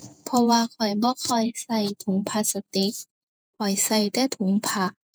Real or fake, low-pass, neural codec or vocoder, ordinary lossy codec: fake; none; vocoder, 48 kHz, 128 mel bands, Vocos; none